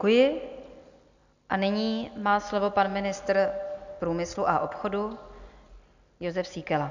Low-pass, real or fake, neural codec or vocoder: 7.2 kHz; real; none